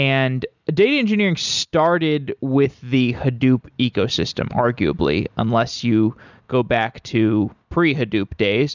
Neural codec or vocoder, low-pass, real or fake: none; 7.2 kHz; real